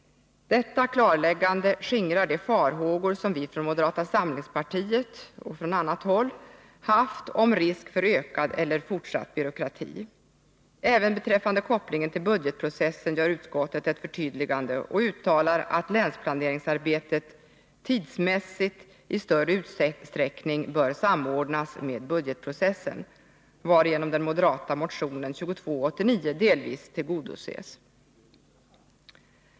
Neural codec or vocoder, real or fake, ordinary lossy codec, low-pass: none; real; none; none